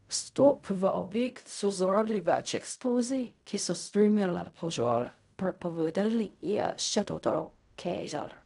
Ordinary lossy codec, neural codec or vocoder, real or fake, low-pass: none; codec, 16 kHz in and 24 kHz out, 0.4 kbps, LongCat-Audio-Codec, fine tuned four codebook decoder; fake; 10.8 kHz